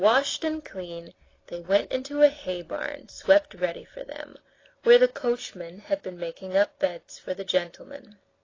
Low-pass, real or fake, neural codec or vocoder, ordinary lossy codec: 7.2 kHz; real; none; AAC, 32 kbps